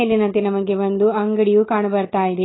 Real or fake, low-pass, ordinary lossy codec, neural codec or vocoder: real; 7.2 kHz; AAC, 16 kbps; none